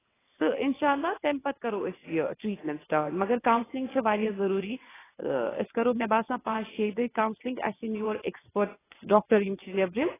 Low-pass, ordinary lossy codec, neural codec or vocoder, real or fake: 3.6 kHz; AAC, 16 kbps; vocoder, 22.05 kHz, 80 mel bands, WaveNeXt; fake